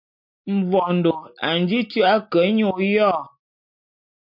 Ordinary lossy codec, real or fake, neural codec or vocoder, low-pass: MP3, 48 kbps; real; none; 5.4 kHz